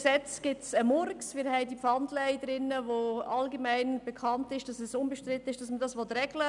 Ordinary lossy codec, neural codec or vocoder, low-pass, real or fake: none; none; 10.8 kHz; real